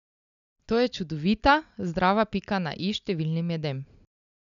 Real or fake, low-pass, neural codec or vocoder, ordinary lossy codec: real; 7.2 kHz; none; none